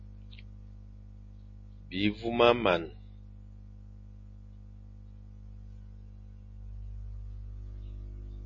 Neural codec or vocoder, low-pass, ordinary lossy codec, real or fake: none; 7.2 kHz; MP3, 32 kbps; real